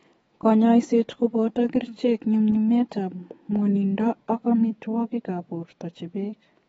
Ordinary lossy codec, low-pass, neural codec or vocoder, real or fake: AAC, 24 kbps; 19.8 kHz; autoencoder, 48 kHz, 128 numbers a frame, DAC-VAE, trained on Japanese speech; fake